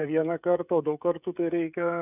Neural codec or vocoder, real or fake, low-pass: codec, 16 kHz, 16 kbps, FreqCodec, smaller model; fake; 3.6 kHz